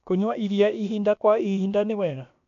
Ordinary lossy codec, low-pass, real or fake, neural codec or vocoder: none; 7.2 kHz; fake; codec, 16 kHz, about 1 kbps, DyCAST, with the encoder's durations